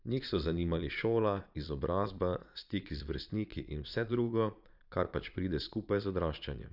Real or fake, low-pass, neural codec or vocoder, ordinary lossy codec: fake; 5.4 kHz; vocoder, 22.05 kHz, 80 mel bands, Vocos; none